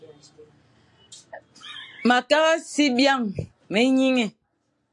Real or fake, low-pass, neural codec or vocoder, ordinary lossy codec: real; 10.8 kHz; none; AAC, 64 kbps